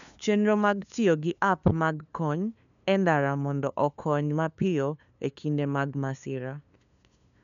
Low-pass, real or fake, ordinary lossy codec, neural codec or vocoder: 7.2 kHz; fake; none; codec, 16 kHz, 2 kbps, FunCodec, trained on LibriTTS, 25 frames a second